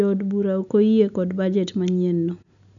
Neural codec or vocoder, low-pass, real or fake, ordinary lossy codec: none; 7.2 kHz; real; none